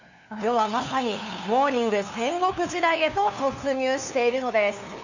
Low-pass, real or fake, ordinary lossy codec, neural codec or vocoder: 7.2 kHz; fake; none; codec, 16 kHz, 2 kbps, FunCodec, trained on LibriTTS, 25 frames a second